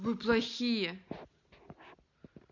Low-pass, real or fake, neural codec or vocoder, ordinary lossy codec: 7.2 kHz; real; none; none